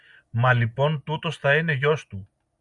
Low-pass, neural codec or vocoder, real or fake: 10.8 kHz; none; real